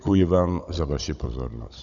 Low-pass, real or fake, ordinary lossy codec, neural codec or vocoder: 7.2 kHz; fake; MP3, 96 kbps; codec, 16 kHz, 16 kbps, FunCodec, trained on Chinese and English, 50 frames a second